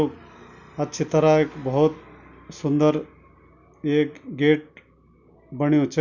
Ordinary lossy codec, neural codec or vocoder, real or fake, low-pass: none; none; real; 7.2 kHz